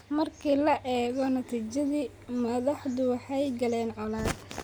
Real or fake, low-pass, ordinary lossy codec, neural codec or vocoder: real; none; none; none